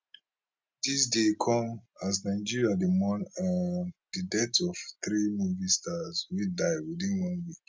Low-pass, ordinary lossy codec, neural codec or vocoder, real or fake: none; none; none; real